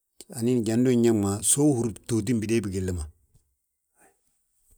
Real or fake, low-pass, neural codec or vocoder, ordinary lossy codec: real; none; none; none